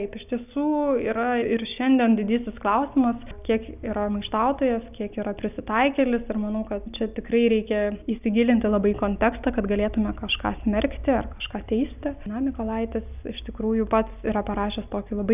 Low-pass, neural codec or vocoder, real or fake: 3.6 kHz; none; real